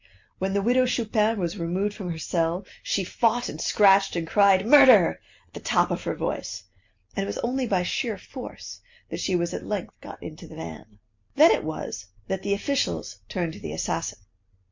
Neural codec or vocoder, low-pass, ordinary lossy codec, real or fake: none; 7.2 kHz; MP3, 48 kbps; real